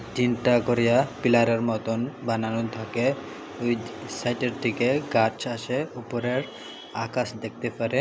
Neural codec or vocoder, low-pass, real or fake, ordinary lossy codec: none; none; real; none